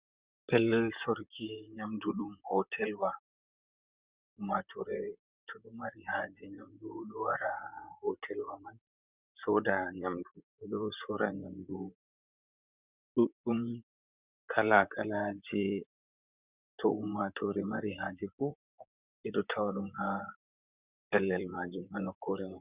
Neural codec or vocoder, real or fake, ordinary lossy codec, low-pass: vocoder, 44.1 kHz, 128 mel bands, Pupu-Vocoder; fake; Opus, 64 kbps; 3.6 kHz